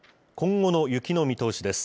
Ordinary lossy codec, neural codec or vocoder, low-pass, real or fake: none; none; none; real